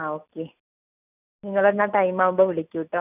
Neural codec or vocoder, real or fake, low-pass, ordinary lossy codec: none; real; 3.6 kHz; none